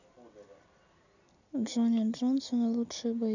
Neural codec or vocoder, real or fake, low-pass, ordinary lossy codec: none; real; 7.2 kHz; none